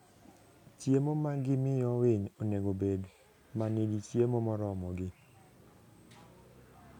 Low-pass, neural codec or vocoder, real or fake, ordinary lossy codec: 19.8 kHz; none; real; none